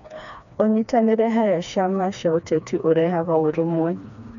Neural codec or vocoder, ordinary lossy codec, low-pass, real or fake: codec, 16 kHz, 2 kbps, FreqCodec, smaller model; none; 7.2 kHz; fake